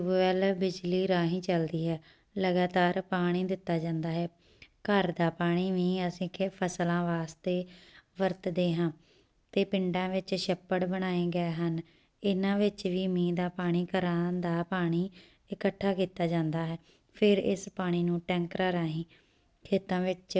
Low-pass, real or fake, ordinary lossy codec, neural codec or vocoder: none; real; none; none